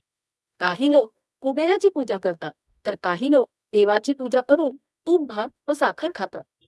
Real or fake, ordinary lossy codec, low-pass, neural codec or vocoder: fake; none; none; codec, 24 kHz, 0.9 kbps, WavTokenizer, medium music audio release